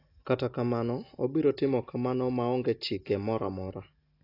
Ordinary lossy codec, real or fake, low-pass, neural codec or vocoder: AAC, 32 kbps; real; 5.4 kHz; none